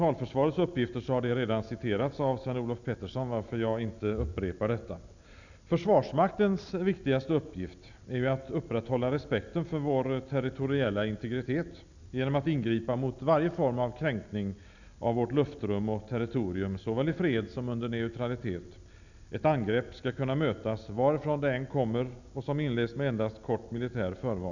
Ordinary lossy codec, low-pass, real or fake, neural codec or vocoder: none; 7.2 kHz; real; none